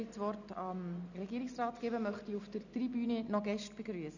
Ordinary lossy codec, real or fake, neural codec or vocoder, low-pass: none; real; none; 7.2 kHz